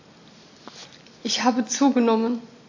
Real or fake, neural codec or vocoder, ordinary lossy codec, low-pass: real; none; AAC, 48 kbps; 7.2 kHz